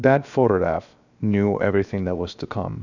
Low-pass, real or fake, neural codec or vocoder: 7.2 kHz; fake; codec, 16 kHz, 0.7 kbps, FocalCodec